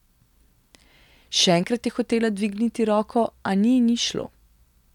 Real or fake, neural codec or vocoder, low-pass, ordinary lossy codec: real; none; 19.8 kHz; none